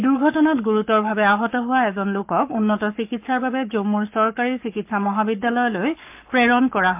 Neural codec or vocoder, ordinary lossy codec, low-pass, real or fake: codec, 44.1 kHz, 7.8 kbps, Pupu-Codec; MP3, 32 kbps; 3.6 kHz; fake